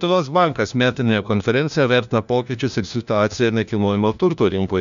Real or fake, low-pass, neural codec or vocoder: fake; 7.2 kHz; codec, 16 kHz, 1 kbps, FunCodec, trained on LibriTTS, 50 frames a second